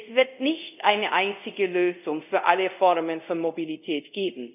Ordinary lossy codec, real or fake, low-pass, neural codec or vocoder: none; fake; 3.6 kHz; codec, 24 kHz, 0.5 kbps, DualCodec